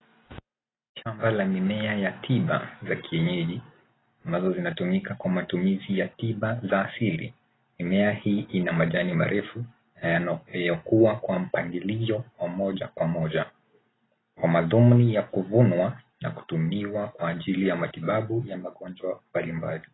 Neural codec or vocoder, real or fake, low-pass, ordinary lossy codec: none; real; 7.2 kHz; AAC, 16 kbps